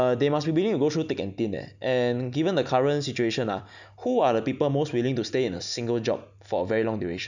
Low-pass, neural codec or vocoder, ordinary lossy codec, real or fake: 7.2 kHz; none; none; real